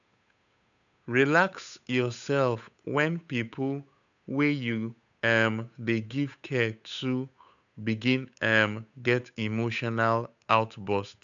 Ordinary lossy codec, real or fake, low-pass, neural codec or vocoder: none; fake; 7.2 kHz; codec, 16 kHz, 8 kbps, FunCodec, trained on Chinese and English, 25 frames a second